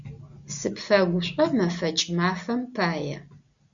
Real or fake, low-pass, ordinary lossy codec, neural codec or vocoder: real; 7.2 kHz; MP3, 64 kbps; none